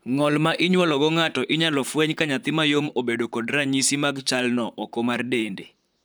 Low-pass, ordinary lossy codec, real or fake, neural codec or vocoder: none; none; fake; vocoder, 44.1 kHz, 128 mel bands, Pupu-Vocoder